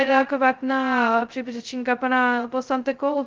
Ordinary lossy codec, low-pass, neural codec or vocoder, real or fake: Opus, 32 kbps; 7.2 kHz; codec, 16 kHz, 0.2 kbps, FocalCodec; fake